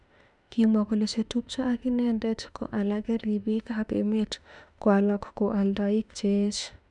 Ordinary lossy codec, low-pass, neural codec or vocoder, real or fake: none; 10.8 kHz; autoencoder, 48 kHz, 32 numbers a frame, DAC-VAE, trained on Japanese speech; fake